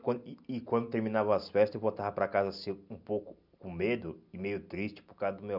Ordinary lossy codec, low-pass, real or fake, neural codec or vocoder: none; 5.4 kHz; real; none